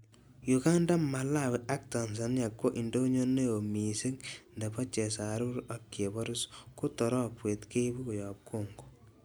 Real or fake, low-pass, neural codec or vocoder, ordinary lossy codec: real; none; none; none